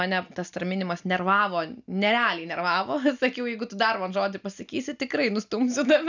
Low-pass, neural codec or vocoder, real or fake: 7.2 kHz; none; real